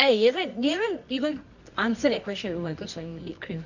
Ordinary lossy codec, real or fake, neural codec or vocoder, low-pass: none; fake; codec, 24 kHz, 0.9 kbps, WavTokenizer, medium music audio release; 7.2 kHz